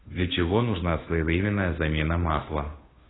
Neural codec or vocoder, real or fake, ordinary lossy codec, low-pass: codec, 16 kHz, 2 kbps, FunCodec, trained on Chinese and English, 25 frames a second; fake; AAC, 16 kbps; 7.2 kHz